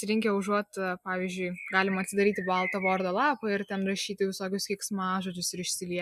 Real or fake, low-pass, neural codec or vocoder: real; 14.4 kHz; none